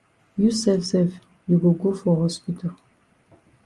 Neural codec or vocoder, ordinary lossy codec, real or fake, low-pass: none; Opus, 32 kbps; real; 10.8 kHz